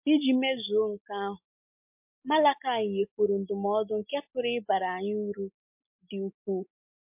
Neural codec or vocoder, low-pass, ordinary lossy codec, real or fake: none; 3.6 kHz; MP3, 32 kbps; real